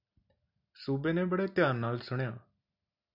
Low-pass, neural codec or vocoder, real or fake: 5.4 kHz; none; real